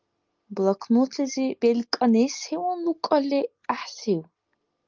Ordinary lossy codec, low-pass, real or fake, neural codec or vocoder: Opus, 24 kbps; 7.2 kHz; real; none